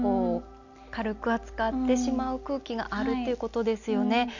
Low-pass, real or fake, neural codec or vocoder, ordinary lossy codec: 7.2 kHz; real; none; none